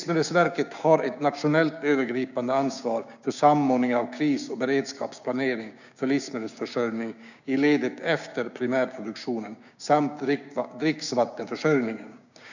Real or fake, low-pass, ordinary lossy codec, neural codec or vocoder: fake; 7.2 kHz; none; codec, 16 kHz, 6 kbps, DAC